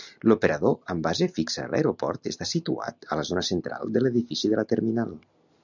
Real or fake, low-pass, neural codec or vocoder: real; 7.2 kHz; none